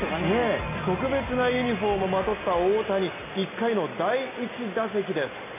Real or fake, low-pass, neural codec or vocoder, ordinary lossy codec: real; 3.6 kHz; none; AAC, 24 kbps